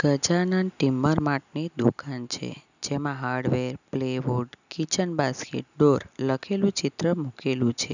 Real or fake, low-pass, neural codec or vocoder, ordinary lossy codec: real; 7.2 kHz; none; none